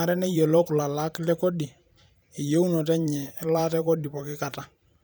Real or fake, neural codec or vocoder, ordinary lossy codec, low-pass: fake; vocoder, 44.1 kHz, 128 mel bands every 512 samples, BigVGAN v2; none; none